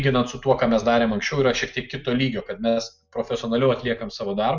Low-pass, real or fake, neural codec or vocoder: 7.2 kHz; real; none